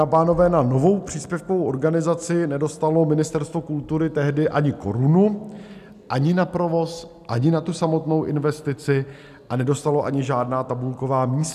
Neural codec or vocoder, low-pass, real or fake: none; 14.4 kHz; real